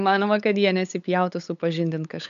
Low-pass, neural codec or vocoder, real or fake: 7.2 kHz; codec, 16 kHz, 8 kbps, FunCodec, trained on LibriTTS, 25 frames a second; fake